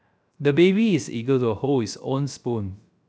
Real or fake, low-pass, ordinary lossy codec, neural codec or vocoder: fake; none; none; codec, 16 kHz, 0.3 kbps, FocalCodec